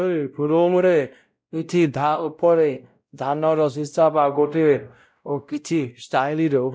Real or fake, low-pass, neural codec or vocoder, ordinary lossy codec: fake; none; codec, 16 kHz, 0.5 kbps, X-Codec, WavLM features, trained on Multilingual LibriSpeech; none